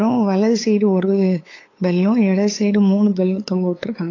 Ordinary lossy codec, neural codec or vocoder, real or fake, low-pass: AAC, 32 kbps; codec, 16 kHz, 8 kbps, FunCodec, trained on LibriTTS, 25 frames a second; fake; 7.2 kHz